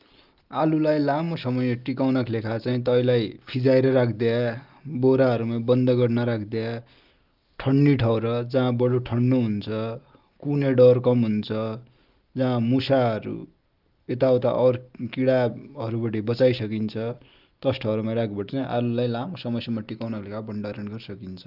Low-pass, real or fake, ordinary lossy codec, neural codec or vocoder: 5.4 kHz; real; Opus, 32 kbps; none